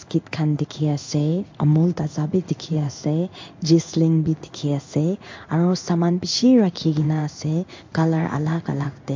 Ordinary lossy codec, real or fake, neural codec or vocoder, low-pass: MP3, 48 kbps; fake; codec, 16 kHz in and 24 kHz out, 1 kbps, XY-Tokenizer; 7.2 kHz